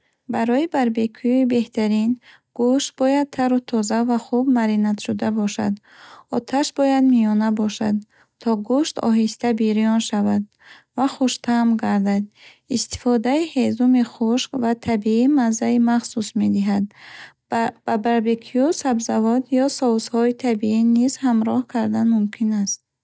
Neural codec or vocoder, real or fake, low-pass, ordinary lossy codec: none; real; none; none